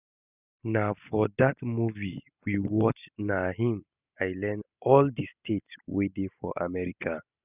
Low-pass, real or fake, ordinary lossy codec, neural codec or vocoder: 3.6 kHz; real; none; none